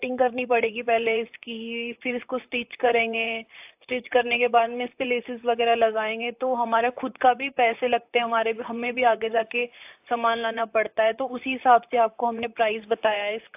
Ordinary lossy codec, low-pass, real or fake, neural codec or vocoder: AAC, 32 kbps; 3.6 kHz; fake; vocoder, 44.1 kHz, 128 mel bands, Pupu-Vocoder